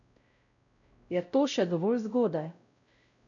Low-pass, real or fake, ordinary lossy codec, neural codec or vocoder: 7.2 kHz; fake; none; codec, 16 kHz, 0.5 kbps, X-Codec, WavLM features, trained on Multilingual LibriSpeech